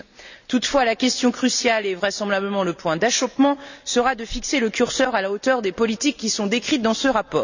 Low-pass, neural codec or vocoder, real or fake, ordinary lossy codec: 7.2 kHz; none; real; none